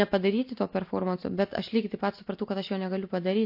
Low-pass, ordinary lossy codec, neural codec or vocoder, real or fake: 5.4 kHz; MP3, 32 kbps; none; real